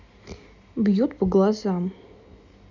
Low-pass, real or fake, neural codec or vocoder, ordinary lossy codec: 7.2 kHz; real; none; none